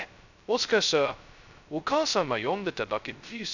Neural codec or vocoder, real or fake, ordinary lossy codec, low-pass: codec, 16 kHz, 0.2 kbps, FocalCodec; fake; none; 7.2 kHz